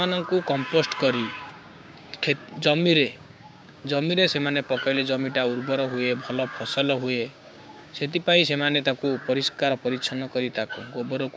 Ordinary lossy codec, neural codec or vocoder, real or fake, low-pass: none; codec, 16 kHz, 6 kbps, DAC; fake; none